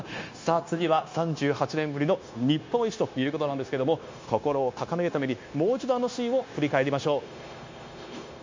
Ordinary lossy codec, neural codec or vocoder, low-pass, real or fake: MP3, 48 kbps; codec, 16 kHz, 0.9 kbps, LongCat-Audio-Codec; 7.2 kHz; fake